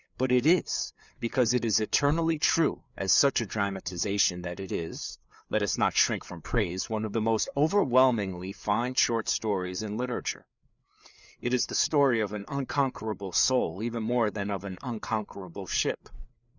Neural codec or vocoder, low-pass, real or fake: codec, 16 kHz, 4 kbps, FreqCodec, larger model; 7.2 kHz; fake